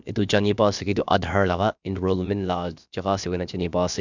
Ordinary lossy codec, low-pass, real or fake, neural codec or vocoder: none; 7.2 kHz; fake; codec, 16 kHz, about 1 kbps, DyCAST, with the encoder's durations